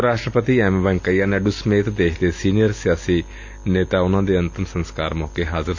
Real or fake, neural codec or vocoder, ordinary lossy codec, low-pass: real; none; AAC, 48 kbps; 7.2 kHz